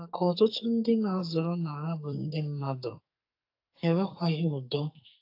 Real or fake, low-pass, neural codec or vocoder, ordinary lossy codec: fake; 5.4 kHz; codec, 44.1 kHz, 2.6 kbps, SNAC; AAC, 32 kbps